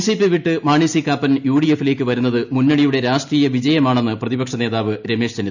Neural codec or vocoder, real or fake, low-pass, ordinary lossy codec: none; real; 7.2 kHz; none